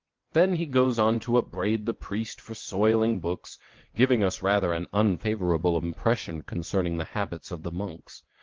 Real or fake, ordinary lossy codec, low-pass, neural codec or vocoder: fake; Opus, 16 kbps; 7.2 kHz; vocoder, 22.05 kHz, 80 mel bands, WaveNeXt